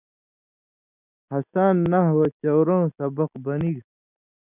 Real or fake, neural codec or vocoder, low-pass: real; none; 3.6 kHz